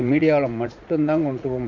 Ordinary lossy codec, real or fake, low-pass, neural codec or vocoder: none; fake; 7.2 kHz; vocoder, 44.1 kHz, 80 mel bands, Vocos